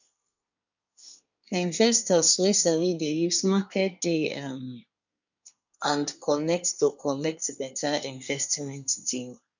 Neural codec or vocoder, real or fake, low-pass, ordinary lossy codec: codec, 24 kHz, 1 kbps, SNAC; fake; 7.2 kHz; none